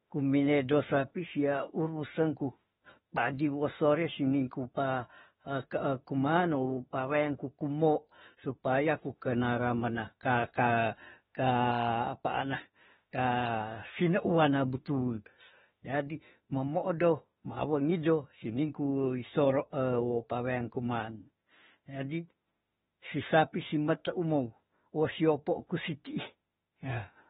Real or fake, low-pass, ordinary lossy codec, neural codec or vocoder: fake; 19.8 kHz; AAC, 16 kbps; autoencoder, 48 kHz, 32 numbers a frame, DAC-VAE, trained on Japanese speech